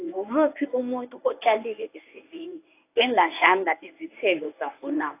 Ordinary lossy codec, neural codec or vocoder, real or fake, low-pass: AAC, 24 kbps; codec, 24 kHz, 0.9 kbps, WavTokenizer, medium speech release version 1; fake; 3.6 kHz